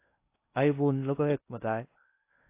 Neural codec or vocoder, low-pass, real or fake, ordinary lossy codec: codec, 16 kHz in and 24 kHz out, 0.6 kbps, FocalCodec, streaming, 2048 codes; 3.6 kHz; fake; AAC, 24 kbps